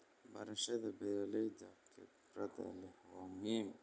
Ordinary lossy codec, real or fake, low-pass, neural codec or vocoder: none; real; none; none